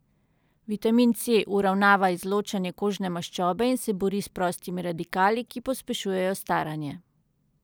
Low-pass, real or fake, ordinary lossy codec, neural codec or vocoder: none; real; none; none